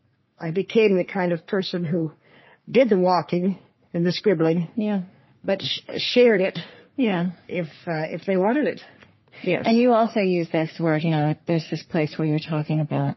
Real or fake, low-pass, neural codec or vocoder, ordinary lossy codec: fake; 7.2 kHz; codec, 44.1 kHz, 3.4 kbps, Pupu-Codec; MP3, 24 kbps